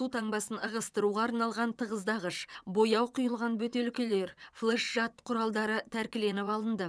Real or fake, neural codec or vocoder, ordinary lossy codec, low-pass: fake; vocoder, 22.05 kHz, 80 mel bands, WaveNeXt; none; none